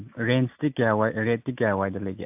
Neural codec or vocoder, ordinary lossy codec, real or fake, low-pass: none; AAC, 32 kbps; real; 3.6 kHz